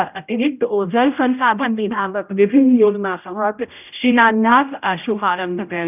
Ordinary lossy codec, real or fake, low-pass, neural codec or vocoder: none; fake; 3.6 kHz; codec, 16 kHz, 0.5 kbps, X-Codec, HuBERT features, trained on general audio